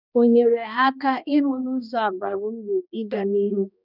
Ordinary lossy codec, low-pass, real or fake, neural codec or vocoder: none; 5.4 kHz; fake; codec, 16 kHz, 1 kbps, X-Codec, HuBERT features, trained on balanced general audio